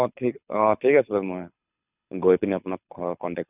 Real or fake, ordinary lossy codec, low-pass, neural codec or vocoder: fake; none; 3.6 kHz; codec, 24 kHz, 6 kbps, HILCodec